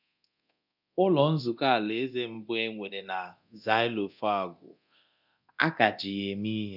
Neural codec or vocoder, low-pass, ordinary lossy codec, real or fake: codec, 24 kHz, 0.9 kbps, DualCodec; 5.4 kHz; none; fake